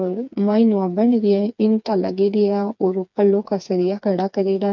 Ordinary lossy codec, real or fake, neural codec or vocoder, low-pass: none; fake; codec, 16 kHz, 4 kbps, FreqCodec, smaller model; 7.2 kHz